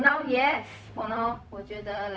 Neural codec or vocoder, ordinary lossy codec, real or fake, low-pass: codec, 16 kHz, 0.4 kbps, LongCat-Audio-Codec; none; fake; none